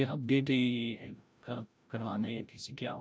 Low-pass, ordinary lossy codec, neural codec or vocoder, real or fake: none; none; codec, 16 kHz, 0.5 kbps, FreqCodec, larger model; fake